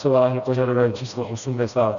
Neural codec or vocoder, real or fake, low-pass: codec, 16 kHz, 1 kbps, FreqCodec, smaller model; fake; 7.2 kHz